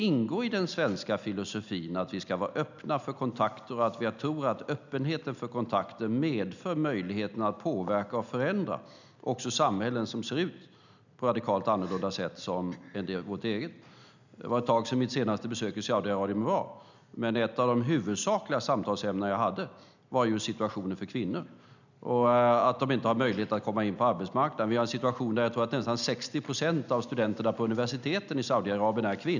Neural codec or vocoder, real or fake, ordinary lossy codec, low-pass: none; real; none; 7.2 kHz